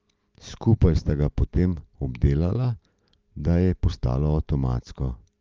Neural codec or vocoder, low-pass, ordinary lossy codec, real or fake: none; 7.2 kHz; Opus, 24 kbps; real